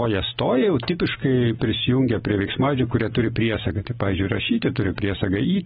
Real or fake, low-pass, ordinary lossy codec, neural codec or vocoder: real; 19.8 kHz; AAC, 16 kbps; none